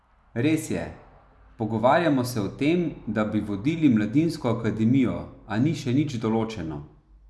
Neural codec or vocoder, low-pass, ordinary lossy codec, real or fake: none; none; none; real